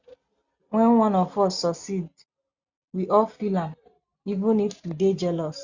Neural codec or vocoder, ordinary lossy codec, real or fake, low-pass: none; Opus, 64 kbps; real; 7.2 kHz